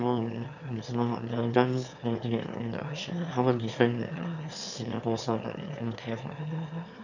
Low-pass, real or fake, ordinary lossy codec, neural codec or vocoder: 7.2 kHz; fake; none; autoencoder, 22.05 kHz, a latent of 192 numbers a frame, VITS, trained on one speaker